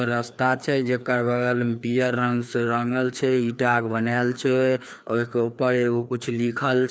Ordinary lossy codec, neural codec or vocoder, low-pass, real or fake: none; codec, 16 kHz, 2 kbps, FreqCodec, larger model; none; fake